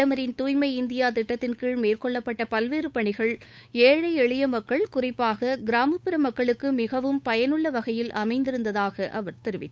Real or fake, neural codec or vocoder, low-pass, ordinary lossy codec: fake; codec, 16 kHz, 8 kbps, FunCodec, trained on Chinese and English, 25 frames a second; none; none